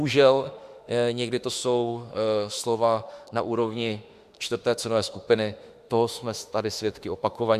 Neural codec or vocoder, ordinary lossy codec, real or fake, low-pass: autoencoder, 48 kHz, 32 numbers a frame, DAC-VAE, trained on Japanese speech; Opus, 64 kbps; fake; 14.4 kHz